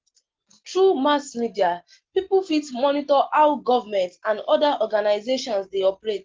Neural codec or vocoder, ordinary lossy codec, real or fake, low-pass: none; Opus, 16 kbps; real; 7.2 kHz